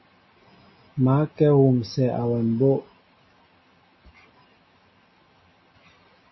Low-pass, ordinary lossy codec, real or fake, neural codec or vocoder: 7.2 kHz; MP3, 24 kbps; real; none